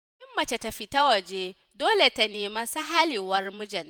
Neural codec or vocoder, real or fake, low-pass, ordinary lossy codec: vocoder, 48 kHz, 128 mel bands, Vocos; fake; none; none